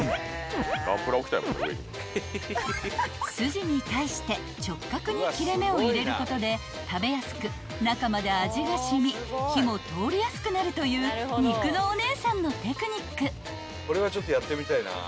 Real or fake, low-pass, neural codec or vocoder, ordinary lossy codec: real; none; none; none